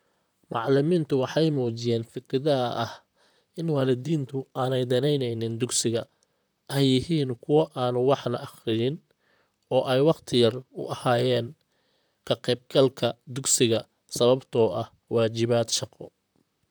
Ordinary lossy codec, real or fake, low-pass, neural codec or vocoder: none; fake; none; vocoder, 44.1 kHz, 128 mel bands, Pupu-Vocoder